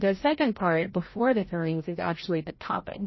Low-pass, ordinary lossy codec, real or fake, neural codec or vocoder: 7.2 kHz; MP3, 24 kbps; fake; codec, 16 kHz, 0.5 kbps, FreqCodec, larger model